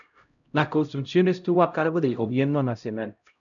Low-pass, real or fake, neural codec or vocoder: 7.2 kHz; fake; codec, 16 kHz, 0.5 kbps, X-Codec, HuBERT features, trained on LibriSpeech